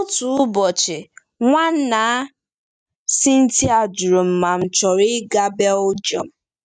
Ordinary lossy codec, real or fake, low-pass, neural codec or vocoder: none; real; 9.9 kHz; none